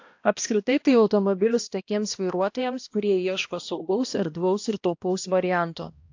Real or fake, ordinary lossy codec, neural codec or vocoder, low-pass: fake; AAC, 48 kbps; codec, 16 kHz, 1 kbps, X-Codec, HuBERT features, trained on balanced general audio; 7.2 kHz